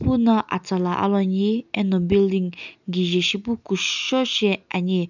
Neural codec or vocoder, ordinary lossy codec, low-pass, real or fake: none; none; 7.2 kHz; real